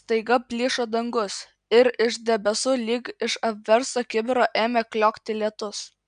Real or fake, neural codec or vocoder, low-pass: real; none; 9.9 kHz